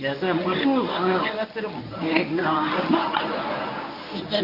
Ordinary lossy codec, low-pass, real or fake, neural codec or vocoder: none; 5.4 kHz; fake; codec, 24 kHz, 0.9 kbps, WavTokenizer, medium speech release version 1